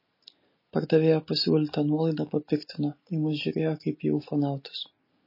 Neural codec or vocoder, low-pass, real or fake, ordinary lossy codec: none; 5.4 kHz; real; MP3, 24 kbps